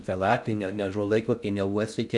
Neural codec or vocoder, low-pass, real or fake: codec, 16 kHz in and 24 kHz out, 0.6 kbps, FocalCodec, streaming, 2048 codes; 10.8 kHz; fake